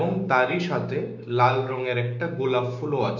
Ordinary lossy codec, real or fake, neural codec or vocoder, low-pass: none; fake; codec, 16 kHz, 6 kbps, DAC; 7.2 kHz